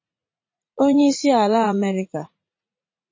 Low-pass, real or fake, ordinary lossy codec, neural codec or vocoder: 7.2 kHz; fake; MP3, 32 kbps; vocoder, 44.1 kHz, 80 mel bands, Vocos